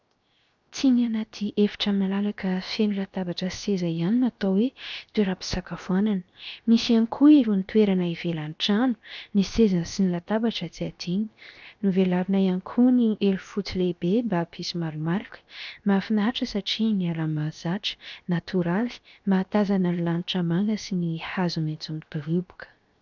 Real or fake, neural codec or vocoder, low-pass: fake; codec, 16 kHz, 0.7 kbps, FocalCodec; 7.2 kHz